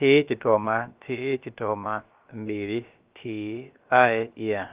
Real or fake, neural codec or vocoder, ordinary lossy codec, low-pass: fake; codec, 16 kHz, about 1 kbps, DyCAST, with the encoder's durations; Opus, 32 kbps; 3.6 kHz